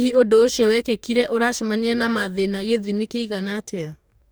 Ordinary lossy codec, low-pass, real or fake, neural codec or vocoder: none; none; fake; codec, 44.1 kHz, 2.6 kbps, DAC